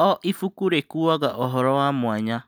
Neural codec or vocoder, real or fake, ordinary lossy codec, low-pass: none; real; none; none